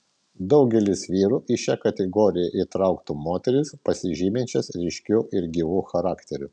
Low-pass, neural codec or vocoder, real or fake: 9.9 kHz; none; real